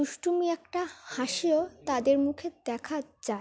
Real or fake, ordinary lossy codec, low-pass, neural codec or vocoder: real; none; none; none